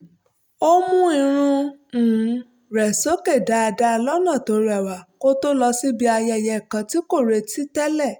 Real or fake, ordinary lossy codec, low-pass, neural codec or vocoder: real; none; none; none